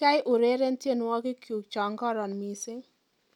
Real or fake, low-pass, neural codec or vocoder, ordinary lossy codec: real; 19.8 kHz; none; none